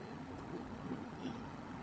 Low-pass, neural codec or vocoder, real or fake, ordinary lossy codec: none; codec, 16 kHz, 16 kbps, FunCodec, trained on LibriTTS, 50 frames a second; fake; none